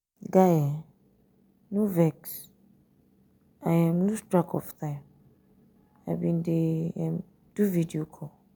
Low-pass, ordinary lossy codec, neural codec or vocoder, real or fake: none; none; none; real